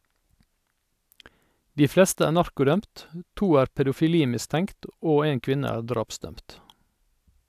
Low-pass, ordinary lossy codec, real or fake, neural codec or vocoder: 14.4 kHz; AAC, 96 kbps; real; none